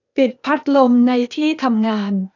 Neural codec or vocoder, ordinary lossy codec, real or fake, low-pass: codec, 16 kHz, 0.8 kbps, ZipCodec; none; fake; 7.2 kHz